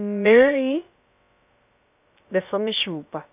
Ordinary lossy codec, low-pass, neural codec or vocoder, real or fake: none; 3.6 kHz; codec, 16 kHz, about 1 kbps, DyCAST, with the encoder's durations; fake